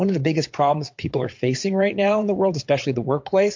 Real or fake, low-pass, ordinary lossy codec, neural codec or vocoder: fake; 7.2 kHz; MP3, 48 kbps; vocoder, 22.05 kHz, 80 mel bands, WaveNeXt